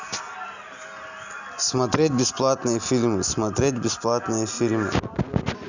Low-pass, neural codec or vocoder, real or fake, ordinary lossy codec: 7.2 kHz; none; real; none